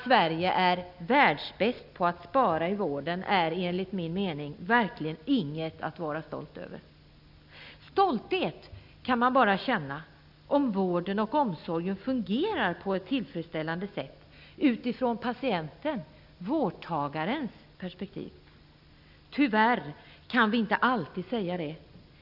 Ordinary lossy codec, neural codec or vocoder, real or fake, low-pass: none; none; real; 5.4 kHz